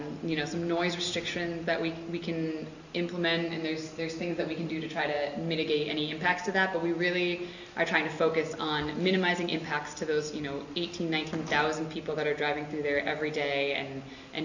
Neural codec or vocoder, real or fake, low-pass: none; real; 7.2 kHz